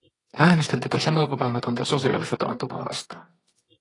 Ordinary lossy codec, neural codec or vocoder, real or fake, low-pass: AAC, 32 kbps; codec, 24 kHz, 0.9 kbps, WavTokenizer, medium music audio release; fake; 10.8 kHz